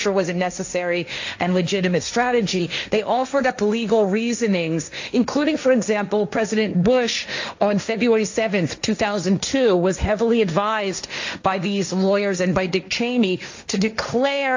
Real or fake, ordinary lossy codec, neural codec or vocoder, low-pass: fake; AAC, 48 kbps; codec, 16 kHz, 1.1 kbps, Voila-Tokenizer; 7.2 kHz